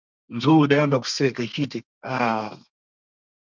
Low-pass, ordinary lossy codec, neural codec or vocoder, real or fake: 7.2 kHz; MP3, 64 kbps; codec, 32 kHz, 1.9 kbps, SNAC; fake